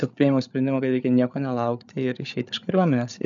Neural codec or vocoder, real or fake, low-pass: codec, 16 kHz, 8 kbps, FreqCodec, larger model; fake; 7.2 kHz